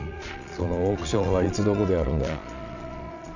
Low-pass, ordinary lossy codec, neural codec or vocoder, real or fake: 7.2 kHz; none; vocoder, 22.05 kHz, 80 mel bands, Vocos; fake